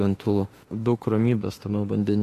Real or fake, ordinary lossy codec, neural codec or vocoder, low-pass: fake; AAC, 48 kbps; autoencoder, 48 kHz, 32 numbers a frame, DAC-VAE, trained on Japanese speech; 14.4 kHz